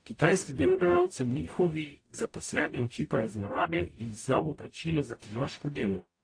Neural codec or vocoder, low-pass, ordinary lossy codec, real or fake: codec, 44.1 kHz, 0.9 kbps, DAC; 9.9 kHz; AAC, 48 kbps; fake